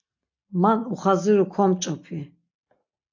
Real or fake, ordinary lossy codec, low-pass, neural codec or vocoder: real; AAC, 48 kbps; 7.2 kHz; none